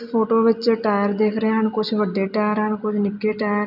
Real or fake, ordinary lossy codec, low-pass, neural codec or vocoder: real; none; 5.4 kHz; none